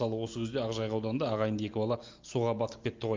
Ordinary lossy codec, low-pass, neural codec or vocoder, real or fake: Opus, 24 kbps; 7.2 kHz; none; real